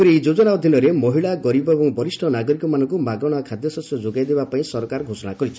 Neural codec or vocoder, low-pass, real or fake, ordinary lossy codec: none; none; real; none